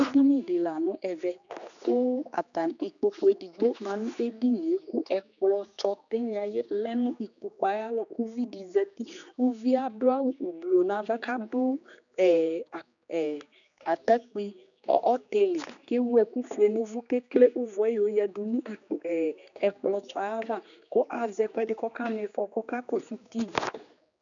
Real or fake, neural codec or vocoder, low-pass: fake; codec, 16 kHz, 2 kbps, X-Codec, HuBERT features, trained on general audio; 7.2 kHz